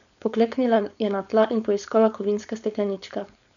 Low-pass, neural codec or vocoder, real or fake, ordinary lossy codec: 7.2 kHz; codec, 16 kHz, 4.8 kbps, FACodec; fake; none